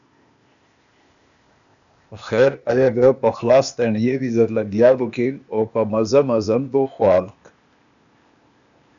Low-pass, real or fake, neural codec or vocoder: 7.2 kHz; fake; codec, 16 kHz, 0.8 kbps, ZipCodec